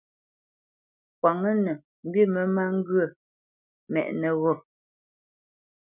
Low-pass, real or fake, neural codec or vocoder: 3.6 kHz; real; none